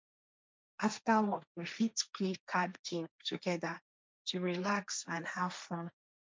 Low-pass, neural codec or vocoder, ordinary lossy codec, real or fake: none; codec, 16 kHz, 1.1 kbps, Voila-Tokenizer; none; fake